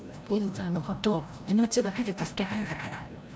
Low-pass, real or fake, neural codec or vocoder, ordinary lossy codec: none; fake; codec, 16 kHz, 0.5 kbps, FreqCodec, larger model; none